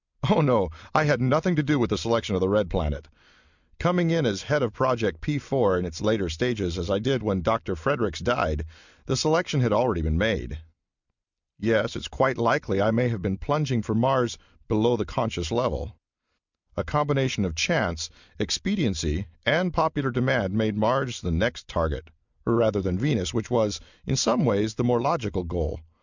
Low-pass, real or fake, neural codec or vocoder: 7.2 kHz; real; none